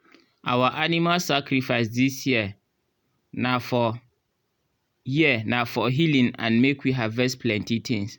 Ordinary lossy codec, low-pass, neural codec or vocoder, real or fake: none; 19.8 kHz; none; real